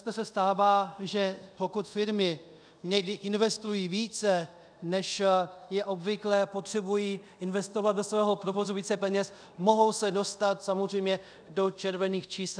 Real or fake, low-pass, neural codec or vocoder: fake; 9.9 kHz; codec, 24 kHz, 0.5 kbps, DualCodec